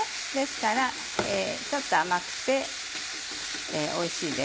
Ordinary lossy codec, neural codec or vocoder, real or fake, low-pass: none; none; real; none